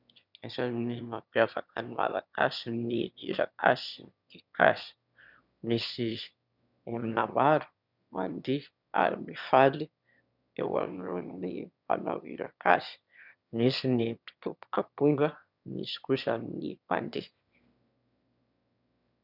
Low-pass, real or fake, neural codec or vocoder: 5.4 kHz; fake; autoencoder, 22.05 kHz, a latent of 192 numbers a frame, VITS, trained on one speaker